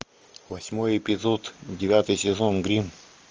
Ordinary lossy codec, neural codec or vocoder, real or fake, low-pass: Opus, 24 kbps; none; real; 7.2 kHz